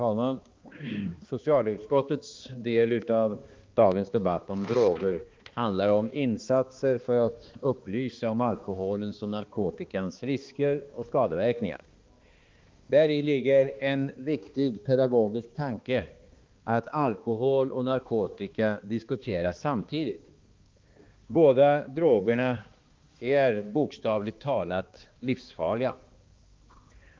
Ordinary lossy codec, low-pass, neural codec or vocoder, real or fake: Opus, 32 kbps; 7.2 kHz; codec, 16 kHz, 2 kbps, X-Codec, HuBERT features, trained on balanced general audio; fake